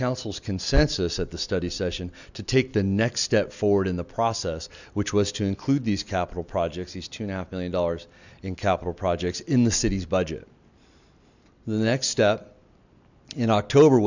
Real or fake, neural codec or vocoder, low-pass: real; none; 7.2 kHz